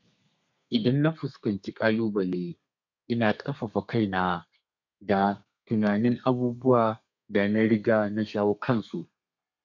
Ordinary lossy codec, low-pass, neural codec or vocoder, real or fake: none; 7.2 kHz; codec, 32 kHz, 1.9 kbps, SNAC; fake